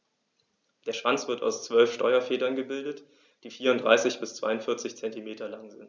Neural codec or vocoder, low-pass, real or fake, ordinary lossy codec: none; none; real; none